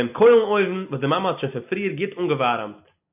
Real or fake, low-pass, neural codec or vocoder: real; 3.6 kHz; none